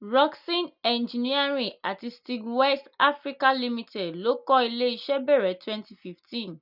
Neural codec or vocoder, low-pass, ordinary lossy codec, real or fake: none; 5.4 kHz; none; real